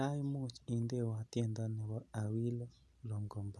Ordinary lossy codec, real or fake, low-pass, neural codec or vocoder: none; real; none; none